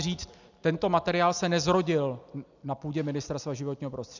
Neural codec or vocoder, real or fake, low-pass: none; real; 7.2 kHz